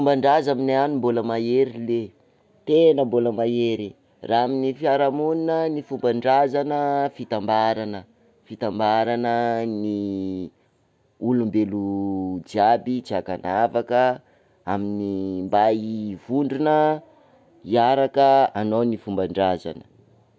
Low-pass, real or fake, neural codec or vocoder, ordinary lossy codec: none; real; none; none